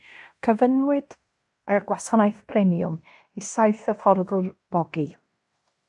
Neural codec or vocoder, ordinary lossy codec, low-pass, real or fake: codec, 16 kHz in and 24 kHz out, 0.9 kbps, LongCat-Audio-Codec, fine tuned four codebook decoder; AAC, 64 kbps; 10.8 kHz; fake